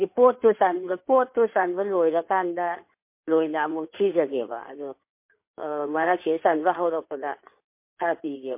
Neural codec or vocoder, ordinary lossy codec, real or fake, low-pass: codec, 16 kHz in and 24 kHz out, 2.2 kbps, FireRedTTS-2 codec; MP3, 24 kbps; fake; 3.6 kHz